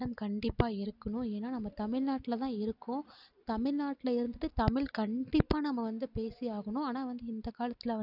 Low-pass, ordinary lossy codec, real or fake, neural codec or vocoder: 5.4 kHz; none; real; none